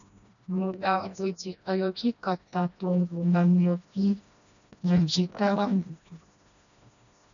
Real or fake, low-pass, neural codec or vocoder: fake; 7.2 kHz; codec, 16 kHz, 1 kbps, FreqCodec, smaller model